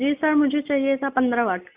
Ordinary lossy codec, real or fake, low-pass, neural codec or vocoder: Opus, 64 kbps; real; 3.6 kHz; none